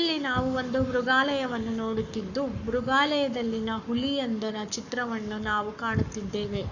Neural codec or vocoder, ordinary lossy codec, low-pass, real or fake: codec, 44.1 kHz, 7.8 kbps, Pupu-Codec; none; 7.2 kHz; fake